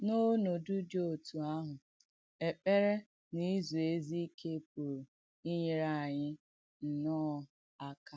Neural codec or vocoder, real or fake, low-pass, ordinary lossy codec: none; real; none; none